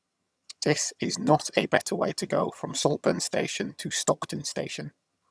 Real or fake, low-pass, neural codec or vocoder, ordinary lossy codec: fake; none; vocoder, 22.05 kHz, 80 mel bands, HiFi-GAN; none